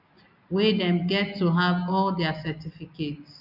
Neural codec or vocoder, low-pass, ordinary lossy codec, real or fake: none; 5.4 kHz; none; real